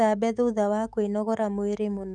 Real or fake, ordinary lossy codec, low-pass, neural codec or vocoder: fake; none; 10.8 kHz; autoencoder, 48 kHz, 128 numbers a frame, DAC-VAE, trained on Japanese speech